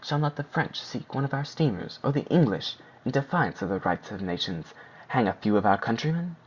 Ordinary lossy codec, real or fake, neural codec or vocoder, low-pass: Opus, 64 kbps; real; none; 7.2 kHz